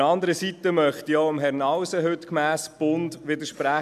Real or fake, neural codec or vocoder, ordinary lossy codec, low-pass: real; none; MP3, 96 kbps; 14.4 kHz